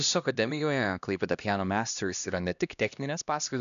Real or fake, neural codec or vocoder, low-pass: fake; codec, 16 kHz, 1 kbps, X-Codec, HuBERT features, trained on LibriSpeech; 7.2 kHz